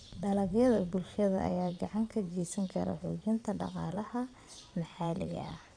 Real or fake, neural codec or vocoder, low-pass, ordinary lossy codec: fake; vocoder, 22.05 kHz, 80 mel bands, Vocos; 9.9 kHz; none